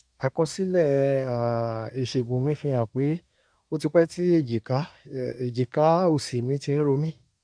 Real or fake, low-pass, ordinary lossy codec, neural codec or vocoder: fake; 9.9 kHz; none; codec, 24 kHz, 1 kbps, SNAC